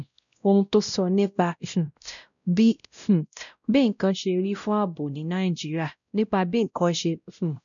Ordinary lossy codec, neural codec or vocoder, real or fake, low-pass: none; codec, 16 kHz, 0.5 kbps, X-Codec, WavLM features, trained on Multilingual LibriSpeech; fake; 7.2 kHz